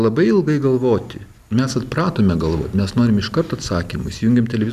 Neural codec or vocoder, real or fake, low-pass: none; real; 14.4 kHz